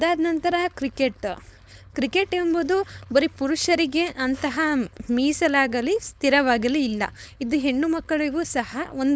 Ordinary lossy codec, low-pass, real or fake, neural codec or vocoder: none; none; fake; codec, 16 kHz, 4.8 kbps, FACodec